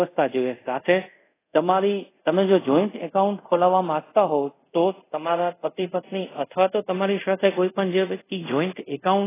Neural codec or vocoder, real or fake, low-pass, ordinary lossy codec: codec, 24 kHz, 0.5 kbps, DualCodec; fake; 3.6 kHz; AAC, 16 kbps